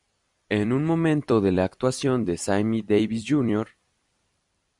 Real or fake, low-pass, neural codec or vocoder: fake; 10.8 kHz; vocoder, 44.1 kHz, 128 mel bands every 256 samples, BigVGAN v2